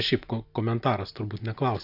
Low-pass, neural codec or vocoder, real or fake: 5.4 kHz; none; real